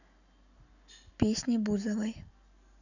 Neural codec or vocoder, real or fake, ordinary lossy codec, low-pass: none; real; none; 7.2 kHz